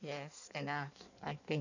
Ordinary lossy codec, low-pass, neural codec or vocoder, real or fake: none; 7.2 kHz; codec, 16 kHz in and 24 kHz out, 1.1 kbps, FireRedTTS-2 codec; fake